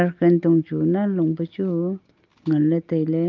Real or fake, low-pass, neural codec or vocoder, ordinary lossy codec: real; 7.2 kHz; none; Opus, 32 kbps